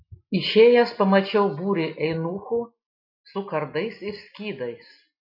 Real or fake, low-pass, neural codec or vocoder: real; 5.4 kHz; none